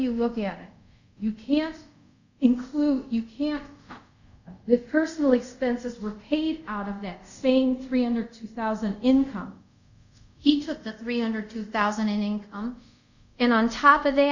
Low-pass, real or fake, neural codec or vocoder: 7.2 kHz; fake; codec, 24 kHz, 0.5 kbps, DualCodec